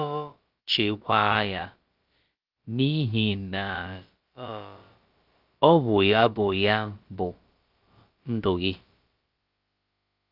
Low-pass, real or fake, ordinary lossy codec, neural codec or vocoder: 5.4 kHz; fake; Opus, 24 kbps; codec, 16 kHz, about 1 kbps, DyCAST, with the encoder's durations